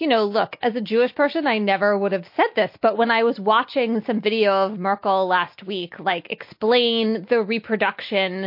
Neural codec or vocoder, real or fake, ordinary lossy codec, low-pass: none; real; MP3, 32 kbps; 5.4 kHz